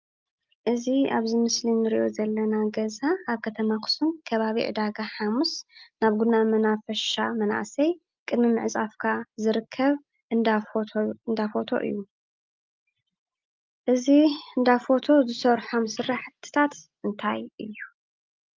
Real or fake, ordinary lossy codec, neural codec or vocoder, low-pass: real; Opus, 24 kbps; none; 7.2 kHz